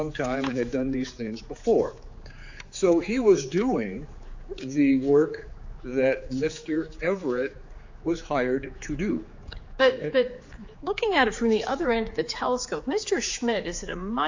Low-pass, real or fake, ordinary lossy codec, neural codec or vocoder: 7.2 kHz; fake; AAC, 48 kbps; codec, 16 kHz, 4 kbps, X-Codec, HuBERT features, trained on general audio